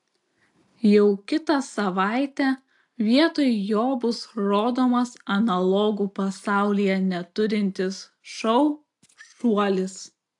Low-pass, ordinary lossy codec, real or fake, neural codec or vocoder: 10.8 kHz; AAC, 64 kbps; real; none